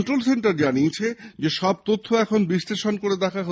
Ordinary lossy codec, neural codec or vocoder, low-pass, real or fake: none; none; none; real